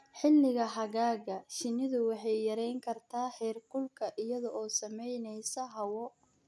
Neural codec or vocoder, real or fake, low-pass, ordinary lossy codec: none; real; none; none